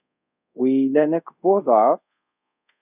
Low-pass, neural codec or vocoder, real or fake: 3.6 kHz; codec, 24 kHz, 0.5 kbps, DualCodec; fake